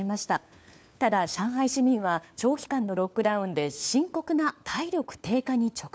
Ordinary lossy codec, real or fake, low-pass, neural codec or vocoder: none; fake; none; codec, 16 kHz, 4 kbps, FunCodec, trained on LibriTTS, 50 frames a second